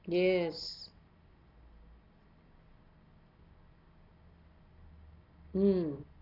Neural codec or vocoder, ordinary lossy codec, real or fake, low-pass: none; none; real; 5.4 kHz